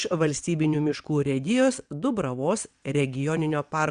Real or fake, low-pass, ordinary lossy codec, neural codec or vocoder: fake; 9.9 kHz; Opus, 64 kbps; vocoder, 22.05 kHz, 80 mel bands, WaveNeXt